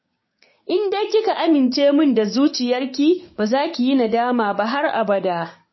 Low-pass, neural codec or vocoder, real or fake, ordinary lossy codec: 7.2 kHz; codec, 16 kHz, 6 kbps, DAC; fake; MP3, 24 kbps